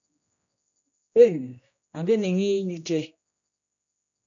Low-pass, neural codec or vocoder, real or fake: 7.2 kHz; codec, 16 kHz, 1 kbps, X-Codec, HuBERT features, trained on general audio; fake